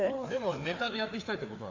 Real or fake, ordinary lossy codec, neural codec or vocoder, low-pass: fake; AAC, 48 kbps; codec, 16 kHz, 4 kbps, FreqCodec, larger model; 7.2 kHz